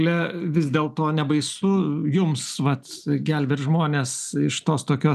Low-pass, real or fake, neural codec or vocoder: 14.4 kHz; real; none